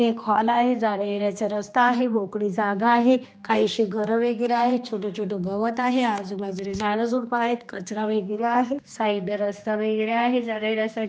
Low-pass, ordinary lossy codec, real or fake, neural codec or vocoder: none; none; fake; codec, 16 kHz, 2 kbps, X-Codec, HuBERT features, trained on general audio